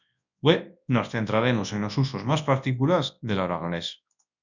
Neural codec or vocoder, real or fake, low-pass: codec, 24 kHz, 0.9 kbps, WavTokenizer, large speech release; fake; 7.2 kHz